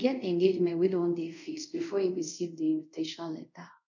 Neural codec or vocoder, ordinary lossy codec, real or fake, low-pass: codec, 24 kHz, 0.5 kbps, DualCodec; none; fake; 7.2 kHz